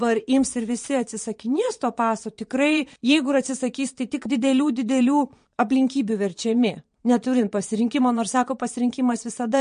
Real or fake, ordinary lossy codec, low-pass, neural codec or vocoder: fake; MP3, 48 kbps; 9.9 kHz; vocoder, 22.05 kHz, 80 mel bands, Vocos